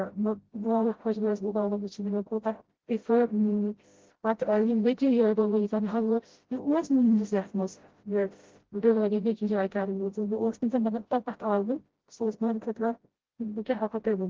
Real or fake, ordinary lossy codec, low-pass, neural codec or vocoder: fake; Opus, 16 kbps; 7.2 kHz; codec, 16 kHz, 0.5 kbps, FreqCodec, smaller model